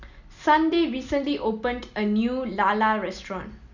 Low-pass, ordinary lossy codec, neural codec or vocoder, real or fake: 7.2 kHz; none; none; real